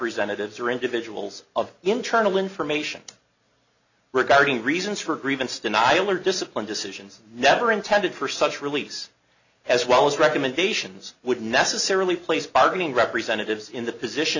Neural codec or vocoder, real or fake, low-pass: none; real; 7.2 kHz